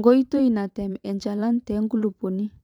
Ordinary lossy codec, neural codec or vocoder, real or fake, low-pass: none; vocoder, 44.1 kHz, 128 mel bands every 512 samples, BigVGAN v2; fake; 19.8 kHz